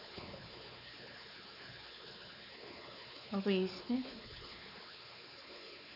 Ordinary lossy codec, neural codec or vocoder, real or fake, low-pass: none; codec, 16 kHz, 4 kbps, X-Codec, WavLM features, trained on Multilingual LibriSpeech; fake; 5.4 kHz